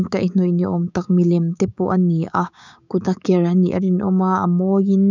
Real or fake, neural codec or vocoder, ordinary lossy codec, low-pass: fake; codec, 16 kHz, 6 kbps, DAC; none; 7.2 kHz